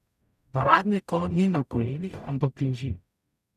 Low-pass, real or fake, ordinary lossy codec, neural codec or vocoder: 14.4 kHz; fake; none; codec, 44.1 kHz, 0.9 kbps, DAC